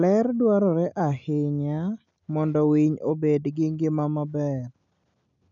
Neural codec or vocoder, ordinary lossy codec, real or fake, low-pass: none; none; real; 7.2 kHz